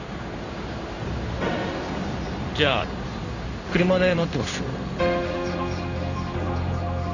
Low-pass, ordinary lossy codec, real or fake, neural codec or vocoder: 7.2 kHz; none; fake; codec, 16 kHz in and 24 kHz out, 1 kbps, XY-Tokenizer